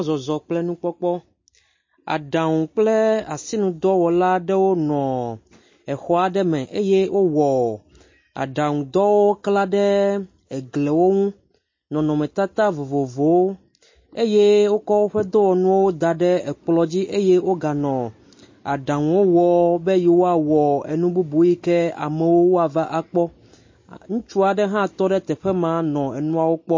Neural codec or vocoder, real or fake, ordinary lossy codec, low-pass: none; real; MP3, 32 kbps; 7.2 kHz